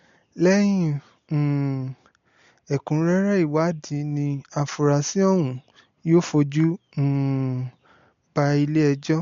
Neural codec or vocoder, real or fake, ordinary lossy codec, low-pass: none; real; MP3, 48 kbps; 7.2 kHz